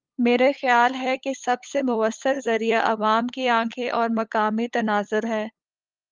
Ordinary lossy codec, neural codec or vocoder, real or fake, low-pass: Opus, 24 kbps; codec, 16 kHz, 8 kbps, FunCodec, trained on LibriTTS, 25 frames a second; fake; 7.2 kHz